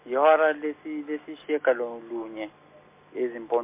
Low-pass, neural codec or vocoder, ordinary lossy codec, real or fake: 3.6 kHz; none; MP3, 24 kbps; real